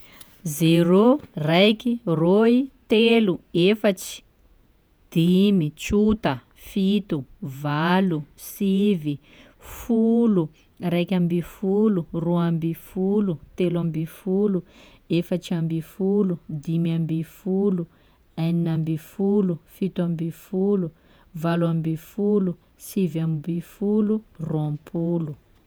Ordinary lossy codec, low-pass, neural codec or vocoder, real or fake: none; none; vocoder, 48 kHz, 128 mel bands, Vocos; fake